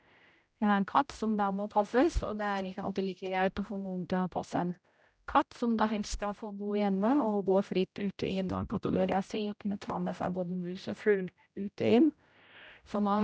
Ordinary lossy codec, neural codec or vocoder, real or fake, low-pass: none; codec, 16 kHz, 0.5 kbps, X-Codec, HuBERT features, trained on general audio; fake; none